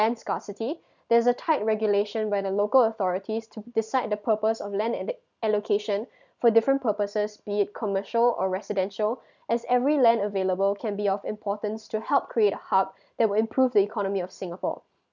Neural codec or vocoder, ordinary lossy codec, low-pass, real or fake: none; none; 7.2 kHz; real